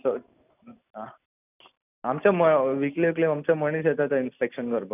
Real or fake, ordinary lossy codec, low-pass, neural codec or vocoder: real; none; 3.6 kHz; none